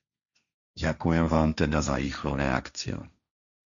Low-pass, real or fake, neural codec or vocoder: 7.2 kHz; fake; codec, 16 kHz, 1.1 kbps, Voila-Tokenizer